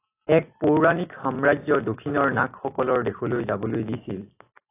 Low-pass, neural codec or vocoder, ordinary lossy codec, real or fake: 3.6 kHz; none; AAC, 24 kbps; real